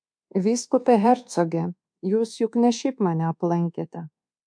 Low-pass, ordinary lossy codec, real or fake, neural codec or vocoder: 9.9 kHz; MP3, 64 kbps; fake; codec, 24 kHz, 1.2 kbps, DualCodec